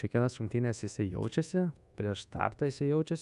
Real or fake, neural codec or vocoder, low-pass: fake; codec, 24 kHz, 1.2 kbps, DualCodec; 10.8 kHz